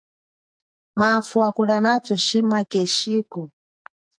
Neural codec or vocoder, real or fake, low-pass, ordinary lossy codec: codec, 44.1 kHz, 2.6 kbps, SNAC; fake; 9.9 kHz; MP3, 64 kbps